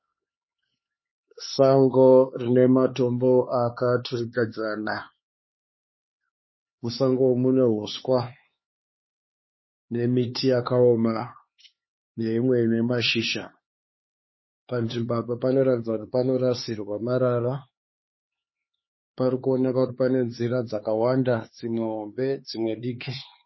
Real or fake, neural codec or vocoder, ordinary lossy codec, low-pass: fake; codec, 16 kHz, 4 kbps, X-Codec, HuBERT features, trained on LibriSpeech; MP3, 24 kbps; 7.2 kHz